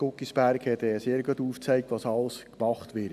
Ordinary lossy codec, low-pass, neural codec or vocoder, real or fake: none; 14.4 kHz; none; real